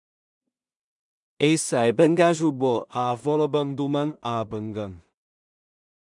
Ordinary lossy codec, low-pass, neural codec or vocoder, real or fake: MP3, 96 kbps; 10.8 kHz; codec, 16 kHz in and 24 kHz out, 0.4 kbps, LongCat-Audio-Codec, two codebook decoder; fake